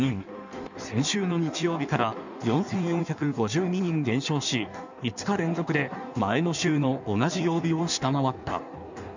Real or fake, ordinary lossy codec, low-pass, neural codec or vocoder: fake; none; 7.2 kHz; codec, 16 kHz in and 24 kHz out, 1.1 kbps, FireRedTTS-2 codec